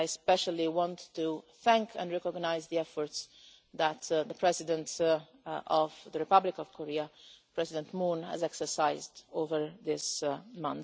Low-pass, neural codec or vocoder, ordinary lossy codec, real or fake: none; none; none; real